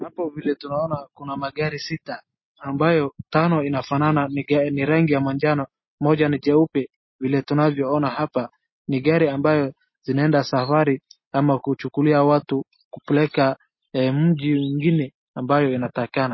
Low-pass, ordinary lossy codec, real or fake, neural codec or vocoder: 7.2 kHz; MP3, 24 kbps; real; none